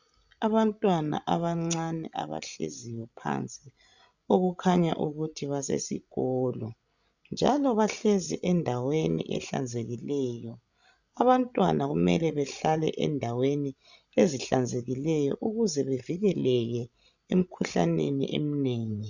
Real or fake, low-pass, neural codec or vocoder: fake; 7.2 kHz; codec, 16 kHz, 16 kbps, FreqCodec, larger model